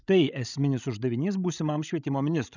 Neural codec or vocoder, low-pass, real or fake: codec, 16 kHz, 16 kbps, FreqCodec, larger model; 7.2 kHz; fake